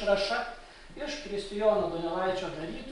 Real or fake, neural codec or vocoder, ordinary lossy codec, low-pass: real; none; MP3, 64 kbps; 10.8 kHz